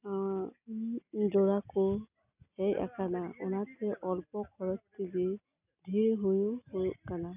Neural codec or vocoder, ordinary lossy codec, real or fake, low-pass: none; none; real; 3.6 kHz